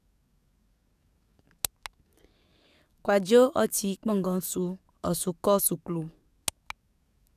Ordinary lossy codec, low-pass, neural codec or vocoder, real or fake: none; 14.4 kHz; codec, 44.1 kHz, 7.8 kbps, DAC; fake